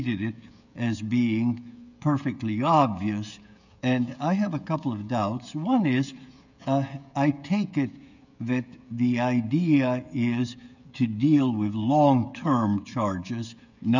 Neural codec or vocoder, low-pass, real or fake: codec, 16 kHz, 16 kbps, FreqCodec, smaller model; 7.2 kHz; fake